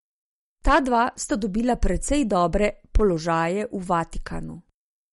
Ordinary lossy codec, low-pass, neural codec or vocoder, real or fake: MP3, 48 kbps; 19.8 kHz; none; real